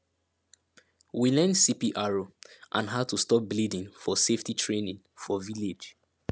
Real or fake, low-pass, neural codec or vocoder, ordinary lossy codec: real; none; none; none